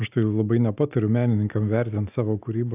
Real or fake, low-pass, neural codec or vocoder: real; 3.6 kHz; none